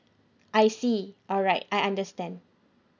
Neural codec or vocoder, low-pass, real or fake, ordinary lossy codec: none; 7.2 kHz; real; none